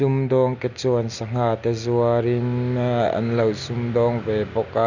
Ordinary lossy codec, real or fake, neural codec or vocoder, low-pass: none; real; none; 7.2 kHz